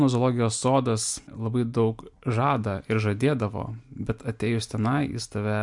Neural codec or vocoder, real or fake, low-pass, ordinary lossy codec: none; real; 10.8 kHz; MP3, 64 kbps